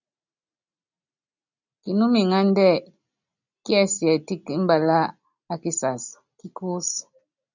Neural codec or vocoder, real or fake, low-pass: none; real; 7.2 kHz